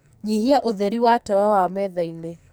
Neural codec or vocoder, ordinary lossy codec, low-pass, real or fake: codec, 44.1 kHz, 2.6 kbps, SNAC; none; none; fake